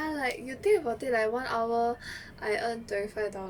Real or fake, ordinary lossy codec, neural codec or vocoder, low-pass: real; Opus, 64 kbps; none; 19.8 kHz